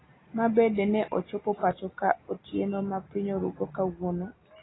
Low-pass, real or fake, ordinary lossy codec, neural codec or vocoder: 7.2 kHz; real; AAC, 16 kbps; none